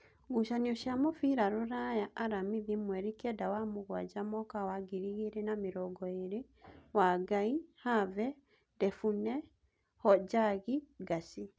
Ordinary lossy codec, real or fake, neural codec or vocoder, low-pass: none; real; none; none